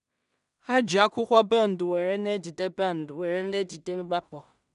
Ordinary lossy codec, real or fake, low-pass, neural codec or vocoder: none; fake; 10.8 kHz; codec, 16 kHz in and 24 kHz out, 0.4 kbps, LongCat-Audio-Codec, two codebook decoder